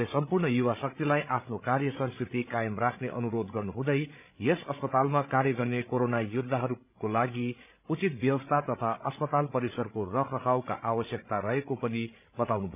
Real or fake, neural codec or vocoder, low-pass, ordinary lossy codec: fake; codec, 16 kHz, 16 kbps, FreqCodec, larger model; 3.6 kHz; none